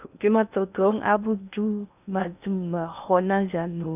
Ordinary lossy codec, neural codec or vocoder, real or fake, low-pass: none; codec, 16 kHz in and 24 kHz out, 0.6 kbps, FocalCodec, streaming, 2048 codes; fake; 3.6 kHz